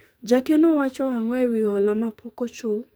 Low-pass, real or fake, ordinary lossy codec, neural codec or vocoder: none; fake; none; codec, 44.1 kHz, 2.6 kbps, SNAC